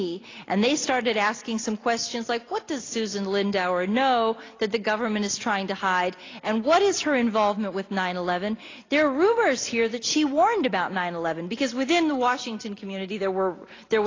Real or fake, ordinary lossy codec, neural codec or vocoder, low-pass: real; AAC, 32 kbps; none; 7.2 kHz